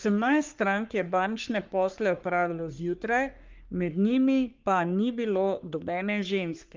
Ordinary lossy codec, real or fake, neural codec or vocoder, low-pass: Opus, 32 kbps; fake; codec, 44.1 kHz, 3.4 kbps, Pupu-Codec; 7.2 kHz